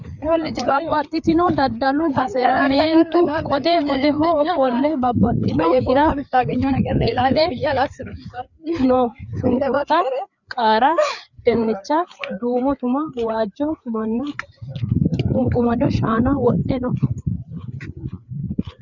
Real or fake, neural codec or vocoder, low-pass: fake; codec, 16 kHz, 4 kbps, FreqCodec, larger model; 7.2 kHz